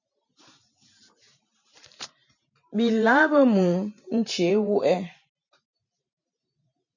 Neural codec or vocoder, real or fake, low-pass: vocoder, 44.1 kHz, 128 mel bands every 512 samples, BigVGAN v2; fake; 7.2 kHz